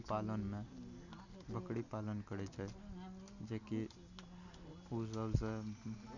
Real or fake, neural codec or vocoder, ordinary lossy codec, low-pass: real; none; none; 7.2 kHz